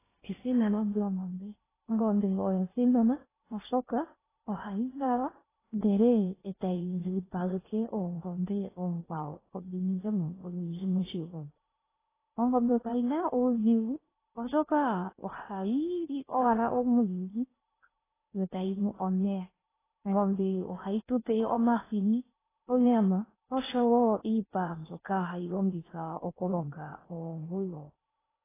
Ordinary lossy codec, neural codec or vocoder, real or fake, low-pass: AAC, 16 kbps; codec, 16 kHz in and 24 kHz out, 0.6 kbps, FocalCodec, streaming, 2048 codes; fake; 3.6 kHz